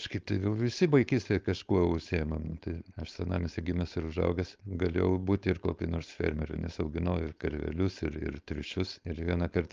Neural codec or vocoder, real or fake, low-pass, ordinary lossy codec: codec, 16 kHz, 4.8 kbps, FACodec; fake; 7.2 kHz; Opus, 24 kbps